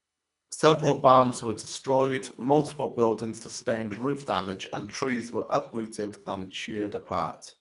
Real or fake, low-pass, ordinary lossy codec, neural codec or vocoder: fake; 10.8 kHz; none; codec, 24 kHz, 1.5 kbps, HILCodec